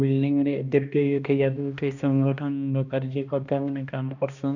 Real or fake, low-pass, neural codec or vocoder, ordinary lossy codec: fake; 7.2 kHz; codec, 16 kHz, 1 kbps, X-Codec, HuBERT features, trained on balanced general audio; none